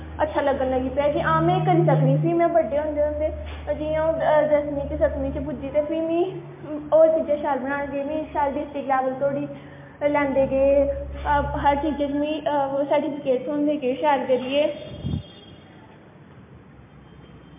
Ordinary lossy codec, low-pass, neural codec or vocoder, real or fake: MP3, 24 kbps; 3.6 kHz; none; real